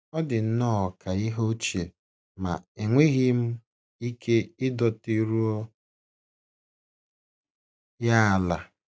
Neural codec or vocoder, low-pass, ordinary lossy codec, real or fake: none; none; none; real